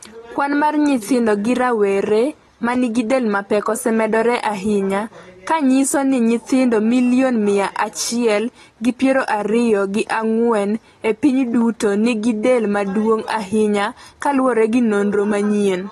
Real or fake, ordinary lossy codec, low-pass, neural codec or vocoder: real; AAC, 32 kbps; 19.8 kHz; none